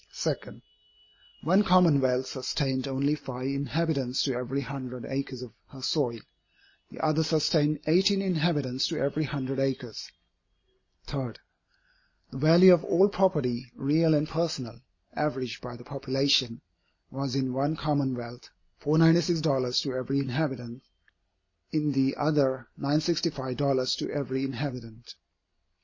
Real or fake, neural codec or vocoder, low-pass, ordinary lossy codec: real; none; 7.2 kHz; MP3, 32 kbps